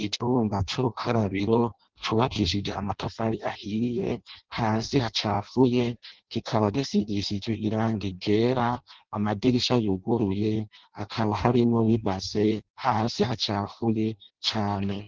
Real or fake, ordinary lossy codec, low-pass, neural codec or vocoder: fake; Opus, 16 kbps; 7.2 kHz; codec, 16 kHz in and 24 kHz out, 0.6 kbps, FireRedTTS-2 codec